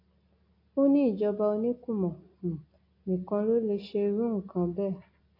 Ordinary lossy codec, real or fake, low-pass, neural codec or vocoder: AAC, 32 kbps; real; 5.4 kHz; none